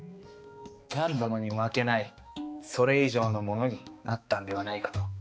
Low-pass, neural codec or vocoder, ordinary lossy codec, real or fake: none; codec, 16 kHz, 2 kbps, X-Codec, HuBERT features, trained on balanced general audio; none; fake